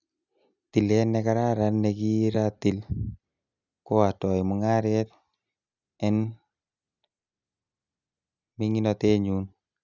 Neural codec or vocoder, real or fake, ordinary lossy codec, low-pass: none; real; none; 7.2 kHz